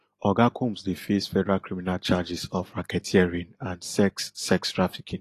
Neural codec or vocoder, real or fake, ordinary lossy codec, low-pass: none; real; AAC, 48 kbps; 14.4 kHz